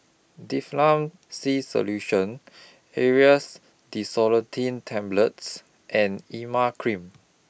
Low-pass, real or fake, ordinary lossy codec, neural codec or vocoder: none; real; none; none